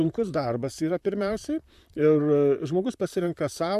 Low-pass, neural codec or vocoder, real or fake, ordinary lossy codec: 14.4 kHz; vocoder, 44.1 kHz, 128 mel bands, Pupu-Vocoder; fake; AAC, 96 kbps